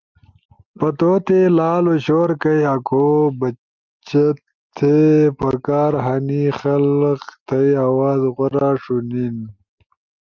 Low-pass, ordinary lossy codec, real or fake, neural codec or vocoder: 7.2 kHz; Opus, 24 kbps; real; none